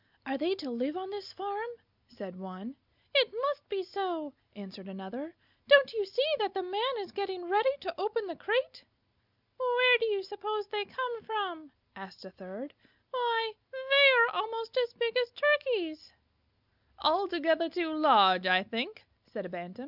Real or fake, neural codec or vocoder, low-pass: real; none; 5.4 kHz